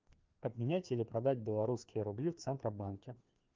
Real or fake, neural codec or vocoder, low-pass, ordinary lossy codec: fake; codec, 16 kHz, 2 kbps, FreqCodec, larger model; 7.2 kHz; Opus, 32 kbps